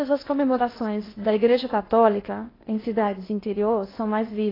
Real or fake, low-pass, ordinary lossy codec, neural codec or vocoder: fake; 5.4 kHz; AAC, 24 kbps; codec, 16 kHz in and 24 kHz out, 0.6 kbps, FocalCodec, streaming, 2048 codes